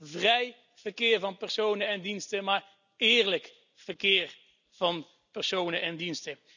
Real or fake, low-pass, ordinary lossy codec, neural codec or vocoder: real; 7.2 kHz; none; none